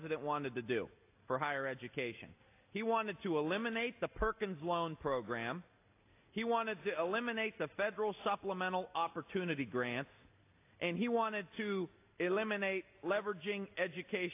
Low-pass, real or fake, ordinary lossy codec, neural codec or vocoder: 3.6 kHz; real; AAC, 24 kbps; none